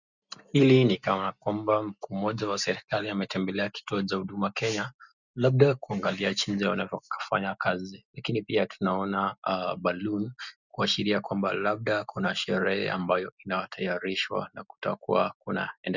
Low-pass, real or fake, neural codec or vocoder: 7.2 kHz; real; none